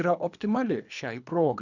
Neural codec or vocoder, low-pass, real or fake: codec, 24 kHz, 3 kbps, HILCodec; 7.2 kHz; fake